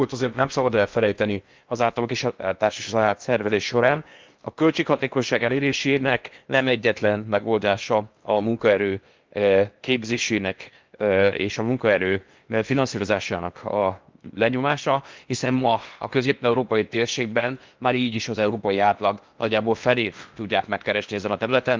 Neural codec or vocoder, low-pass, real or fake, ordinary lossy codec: codec, 16 kHz in and 24 kHz out, 0.8 kbps, FocalCodec, streaming, 65536 codes; 7.2 kHz; fake; Opus, 24 kbps